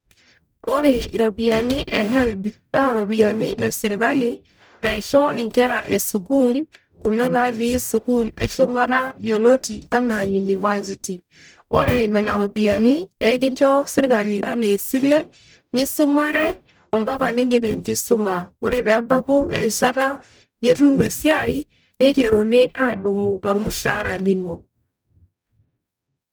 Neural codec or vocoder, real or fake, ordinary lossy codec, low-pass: codec, 44.1 kHz, 0.9 kbps, DAC; fake; none; none